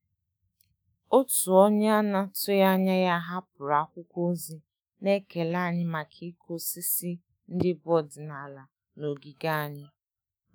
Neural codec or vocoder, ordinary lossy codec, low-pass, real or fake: autoencoder, 48 kHz, 128 numbers a frame, DAC-VAE, trained on Japanese speech; none; none; fake